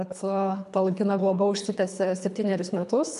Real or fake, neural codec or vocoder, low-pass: fake; codec, 24 kHz, 3 kbps, HILCodec; 10.8 kHz